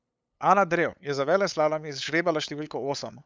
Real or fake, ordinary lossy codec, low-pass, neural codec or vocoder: fake; none; none; codec, 16 kHz, 8 kbps, FunCodec, trained on LibriTTS, 25 frames a second